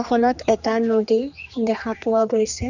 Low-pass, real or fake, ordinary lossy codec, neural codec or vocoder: 7.2 kHz; fake; none; codec, 16 kHz, 2 kbps, X-Codec, HuBERT features, trained on general audio